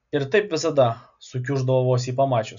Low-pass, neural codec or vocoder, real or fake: 7.2 kHz; none; real